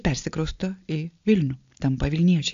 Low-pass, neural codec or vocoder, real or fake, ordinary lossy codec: 7.2 kHz; none; real; AAC, 64 kbps